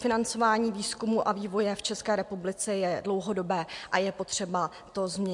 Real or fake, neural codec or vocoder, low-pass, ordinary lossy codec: real; none; 10.8 kHz; MP3, 64 kbps